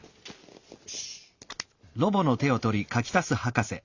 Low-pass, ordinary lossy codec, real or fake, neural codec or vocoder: 7.2 kHz; Opus, 64 kbps; real; none